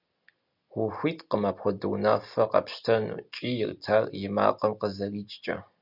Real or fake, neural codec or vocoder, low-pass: real; none; 5.4 kHz